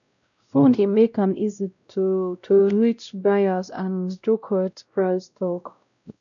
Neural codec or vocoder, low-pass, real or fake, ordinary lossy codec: codec, 16 kHz, 0.5 kbps, X-Codec, WavLM features, trained on Multilingual LibriSpeech; 7.2 kHz; fake; none